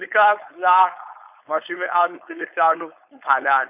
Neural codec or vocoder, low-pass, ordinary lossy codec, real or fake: codec, 16 kHz, 4.8 kbps, FACodec; 3.6 kHz; AAC, 32 kbps; fake